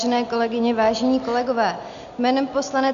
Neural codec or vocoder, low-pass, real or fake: none; 7.2 kHz; real